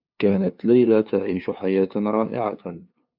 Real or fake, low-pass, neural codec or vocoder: fake; 5.4 kHz; codec, 16 kHz, 2 kbps, FunCodec, trained on LibriTTS, 25 frames a second